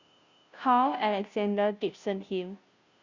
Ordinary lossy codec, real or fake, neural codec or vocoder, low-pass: Opus, 64 kbps; fake; codec, 16 kHz, 0.5 kbps, FunCodec, trained on Chinese and English, 25 frames a second; 7.2 kHz